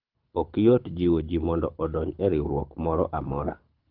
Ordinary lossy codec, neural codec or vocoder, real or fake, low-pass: Opus, 24 kbps; codec, 24 kHz, 6 kbps, HILCodec; fake; 5.4 kHz